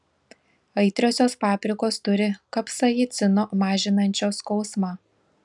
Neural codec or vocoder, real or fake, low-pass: none; real; 10.8 kHz